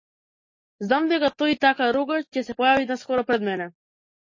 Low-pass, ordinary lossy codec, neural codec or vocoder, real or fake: 7.2 kHz; MP3, 32 kbps; none; real